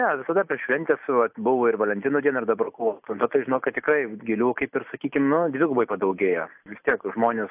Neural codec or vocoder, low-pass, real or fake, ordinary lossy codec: none; 3.6 kHz; real; AAC, 32 kbps